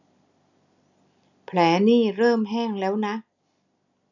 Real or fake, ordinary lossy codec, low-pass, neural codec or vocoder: real; none; 7.2 kHz; none